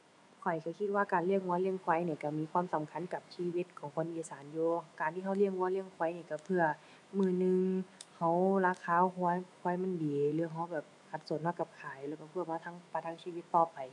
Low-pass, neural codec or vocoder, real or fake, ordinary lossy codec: 10.8 kHz; autoencoder, 48 kHz, 128 numbers a frame, DAC-VAE, trained on Japanese speech; fake; none